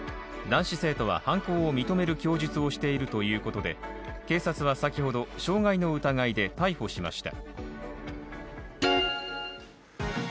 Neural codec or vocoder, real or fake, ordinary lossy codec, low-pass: none; real; none; none